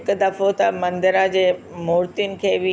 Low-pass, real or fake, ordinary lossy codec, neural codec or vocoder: none; real; none; none